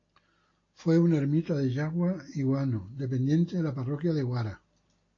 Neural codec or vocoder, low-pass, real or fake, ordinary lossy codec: none; 7.2 kHz; real; AAC, 32 kbps